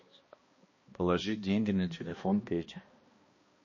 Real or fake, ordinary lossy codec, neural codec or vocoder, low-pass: fake; MP3, 32 kbps; codec, 16 kHz, 1 kbps, X-Codec, HuBERT features, trained on balanced general audio; 7.2 kHz